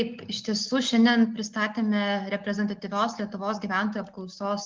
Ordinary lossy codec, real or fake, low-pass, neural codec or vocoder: Opus, 32 kbps; real; 7.2 kHz; none